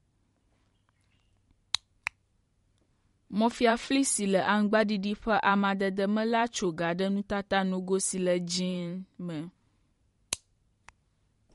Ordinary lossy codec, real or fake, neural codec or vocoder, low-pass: MP3, 48 kbps; fake; vocoder, 44.1 kHz, 128 mel bands every 512 samples, BigVGAN v2; 19.8 kHz